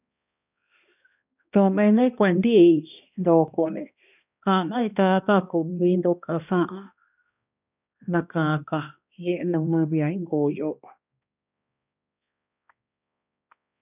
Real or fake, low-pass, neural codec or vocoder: fake; 3.6 kHz; codec, 16 kHz, 1 kbps, X-Codec, HuBERT features, trained on balanced general audio